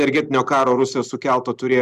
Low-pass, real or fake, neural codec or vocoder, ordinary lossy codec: 14.4 kHz; real; none; Opus, 64 kbps